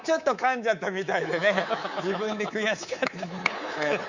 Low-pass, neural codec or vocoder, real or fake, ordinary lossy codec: 7.2 kHz; codec, 24 kHz, 3.1 kbps, DualCodec; fake; Opus, 64 kbps